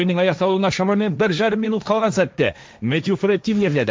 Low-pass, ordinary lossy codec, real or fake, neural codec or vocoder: none; none; fake; codec, 16 kHz, 1.1 kbps, Voila-Tokenizer